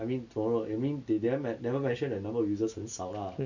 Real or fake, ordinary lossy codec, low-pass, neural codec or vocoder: real; none; 7.2 kHz; none